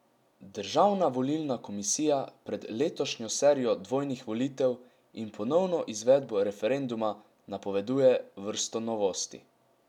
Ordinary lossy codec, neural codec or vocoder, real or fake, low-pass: none; none; real; 19.8 kHz